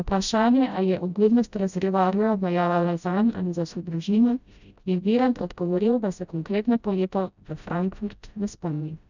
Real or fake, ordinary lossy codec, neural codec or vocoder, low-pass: fake; none; codec, 16 kHz, 0.5 kbps, FreqCodec, smaller model; 7.2 kHz